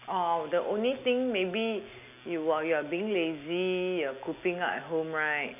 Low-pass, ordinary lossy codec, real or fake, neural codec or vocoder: 3.6 kHz; none; real; none